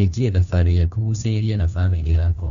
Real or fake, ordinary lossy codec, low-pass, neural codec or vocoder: fake; none; 7.2 kHz; codec, 16 kHz, 1 kbps, FunCodec, trained on LibriTTS, 50 frames a second